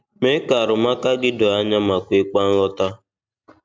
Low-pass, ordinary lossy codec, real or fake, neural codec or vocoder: none; none; real; none